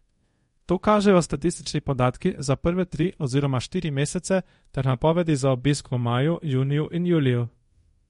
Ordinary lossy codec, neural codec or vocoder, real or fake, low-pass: MP3, 48 kbps; codec, 24 kHz, 0.5 kbps, DualCodec; fake; 10.8 kHz